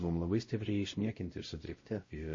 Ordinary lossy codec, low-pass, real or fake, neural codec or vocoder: MP3, 32 kbps; 7.2 kHz; fake; codec, 16 kHz, 0.5 kbps, X-Codec, WavLM features, trained on Multilingual LibriSpeech